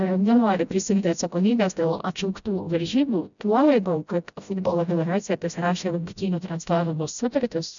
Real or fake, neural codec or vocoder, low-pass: fake; codec, 16 kHz, 0.5 kbps, FreqCodec, smaller model; 7.2 kHz